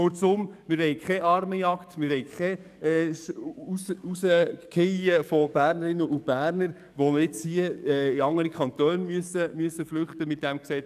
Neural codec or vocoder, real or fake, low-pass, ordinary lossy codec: codec, 44.1 kHz, 7.8 kbps, DAC; fake; 14.4 kHz; none